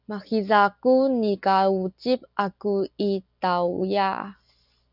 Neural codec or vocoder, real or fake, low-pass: none; real; 5.4 kHz